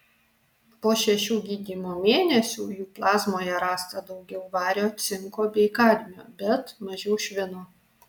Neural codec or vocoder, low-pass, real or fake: none; 19.8 kHz; real